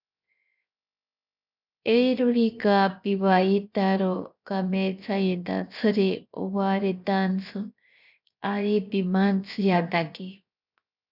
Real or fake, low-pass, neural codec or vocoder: fake; 5.4 kHz; codec, 16 kHz, 0.7 kbps, FocalCodec